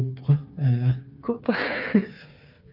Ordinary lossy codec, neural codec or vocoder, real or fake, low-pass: none; codec, 16 kHz, 4 kbps, FreqCodec, smaller model; fake; 5.4 kHz